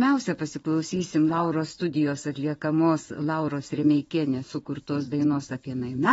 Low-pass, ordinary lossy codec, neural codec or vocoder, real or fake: 9.9 kHz; AAC, 24 kbps; vocoder, 22.05 kHz, 80 mel bands, WaveNeXt; fake